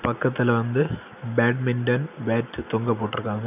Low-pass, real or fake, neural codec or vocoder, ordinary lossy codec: 3.6 kHz; real; none; none